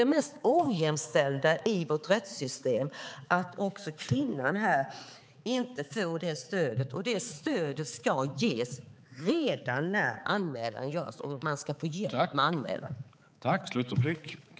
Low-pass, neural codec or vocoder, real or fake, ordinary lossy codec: none; codec, 16 kHz, 4 kbps, X-Codec, HuBERT features, trained on balanced general audio; fake; none